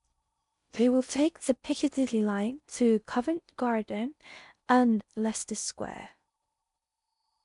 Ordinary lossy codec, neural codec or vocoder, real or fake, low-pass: none; codec, 16 kHz in and 24 kHz out, 0.6 kbps, FocalCodec, streaming, 4096 codes; fake; 10.8 kHz